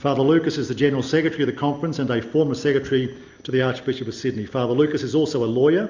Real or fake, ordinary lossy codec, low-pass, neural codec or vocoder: real; MP3, 64 kbps; 7.2 kHz; none